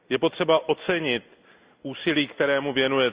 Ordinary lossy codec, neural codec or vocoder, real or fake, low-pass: Opus, 64 kbps; none; real; 3.6 kHz